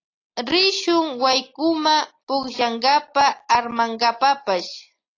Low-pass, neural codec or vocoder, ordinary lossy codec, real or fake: 7.2 kHz; none; AAC, 32 kbps; real